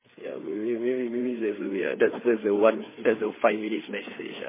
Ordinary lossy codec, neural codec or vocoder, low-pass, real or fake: MP3, 16 kbps; codec, 16 kHz, 4 kbps, FreqCodec, larger model; 3.6 kHz; fake